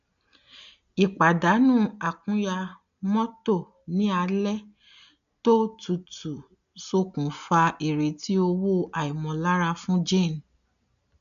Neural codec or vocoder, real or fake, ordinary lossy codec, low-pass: none; real; none; 7.2 kHz